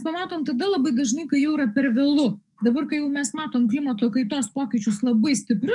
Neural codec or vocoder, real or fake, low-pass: codec, 44.1 kHz, 7.8 kbps, DAC; fake; 10.8 kHz